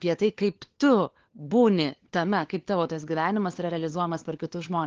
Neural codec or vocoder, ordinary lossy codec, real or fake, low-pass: codec, 16 kHz, 4 kbps, FunCodec, trained on LibriTTS, 50 frames a second; Opus, 16 kbps; fake; 7.2 kHz